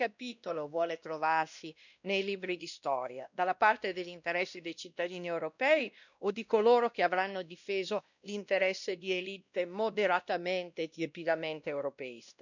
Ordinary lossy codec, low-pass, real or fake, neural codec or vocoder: none; 7.2 kHz; fake; codec, 16 kHz, 1 kbps, X-Codec, WavLM features, trained on Multilingual LibriSpeech